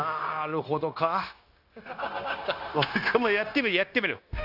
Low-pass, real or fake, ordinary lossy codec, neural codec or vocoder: 5.4 kHz; fake; AAC, 48 kbps; codec, 16 kHz, 0.9 kbps, LongCat-Audio-Codec